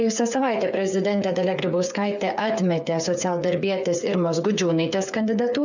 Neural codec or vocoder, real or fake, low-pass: codec, 16 kHz, 8 kbps, FreqCodec, smaller model; fake; 7.2 kHz